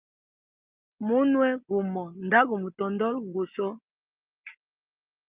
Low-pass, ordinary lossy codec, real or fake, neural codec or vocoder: 3.6 kHz; Opus, 24 kbps; real; none